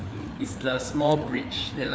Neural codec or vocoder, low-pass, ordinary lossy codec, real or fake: codec, 16 kHz, 4 kbps, FreqCodec, larger model; none; none; fake